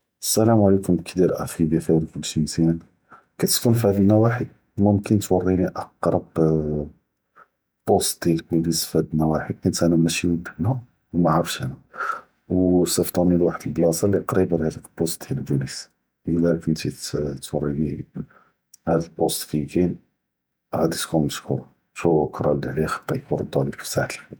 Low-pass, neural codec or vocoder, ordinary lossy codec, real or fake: none; vocoder, 48 kHz, 128 mel bands, Vocos; none; fake